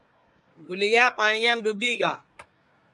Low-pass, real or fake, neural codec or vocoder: 10.8 kHz; fake; codec, 24 kHz, 1 kbps, SNAC